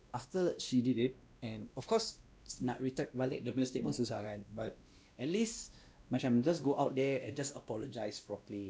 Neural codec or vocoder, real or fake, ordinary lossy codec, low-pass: codec, 16 kHz, 1 kbps, X-Codec, WavLM features, trained on Multilingual LibriSpeech; fake; none; none